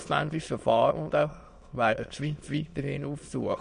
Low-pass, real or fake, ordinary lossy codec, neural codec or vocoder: 9.9 kHz; fake; MP3, 48 kbps; autoencoder, 22.05 kHz, a latent of 192 numbers a frame, VITS, trained on many speakers